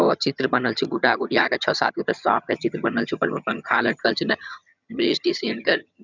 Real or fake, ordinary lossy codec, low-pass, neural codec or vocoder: fake; none; 7.2 kHz; vocoder, 22.05 kHz, 80 mel bands, HiFi-GAN